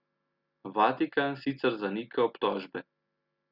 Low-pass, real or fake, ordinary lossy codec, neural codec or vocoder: 5.4 kHz; real; Opus, 64 kbps; none